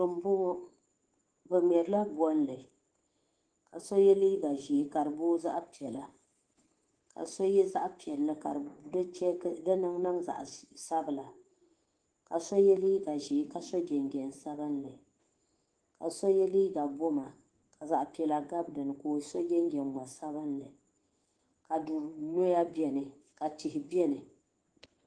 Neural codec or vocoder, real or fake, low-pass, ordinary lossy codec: codec, 24 kHz, 3.1 kbps, DualCodec; fake; 9.9 kHz; Opus, 16 kbps